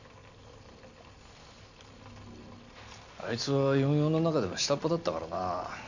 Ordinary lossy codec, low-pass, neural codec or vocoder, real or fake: none; 7.2 kHz; none; real